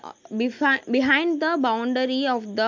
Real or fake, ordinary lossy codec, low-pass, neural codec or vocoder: real; MP3, 64 kbps; 7.2 kHz; none